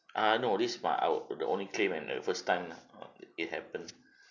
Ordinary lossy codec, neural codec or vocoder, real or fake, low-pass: none; none; real; 7.2 kHz